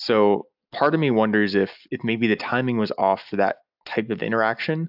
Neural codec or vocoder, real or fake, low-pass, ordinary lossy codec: none; real; 5.4 kHz; AAC, 48 kbps